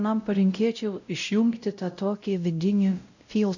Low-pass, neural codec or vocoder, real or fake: 7.2 kHz; codec, 16 kHz, 0.5 kbps, X-Codec, WavLM features, trained on Multilingual LibriSpeech; fake